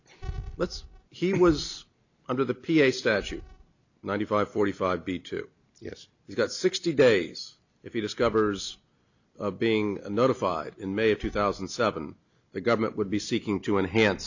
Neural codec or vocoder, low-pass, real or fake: none; 7.2 kHz; real